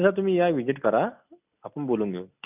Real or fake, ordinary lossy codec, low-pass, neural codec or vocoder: real; none; 3.6 kHz; none